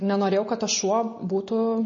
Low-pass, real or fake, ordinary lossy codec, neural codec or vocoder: 7.2 kHz; real; MP3, 32 kbps; none